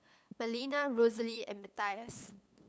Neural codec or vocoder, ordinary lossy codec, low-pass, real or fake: codec, 16 kHz, 8 kbps, FunCodec, trained on LibriTTS, 25 frames a second; none; none; fake